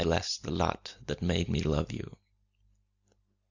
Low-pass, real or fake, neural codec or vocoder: 7.2 kHz; real; none